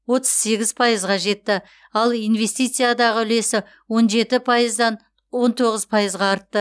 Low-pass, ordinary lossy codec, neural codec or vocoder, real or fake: none; none; none; real